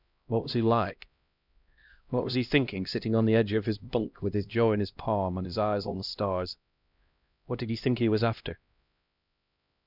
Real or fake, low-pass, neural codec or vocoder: fake; 5.4 kHz; codec, 16 kHz, 1 kbps, X-Codec, HuBERT features, trained on LibriSpeech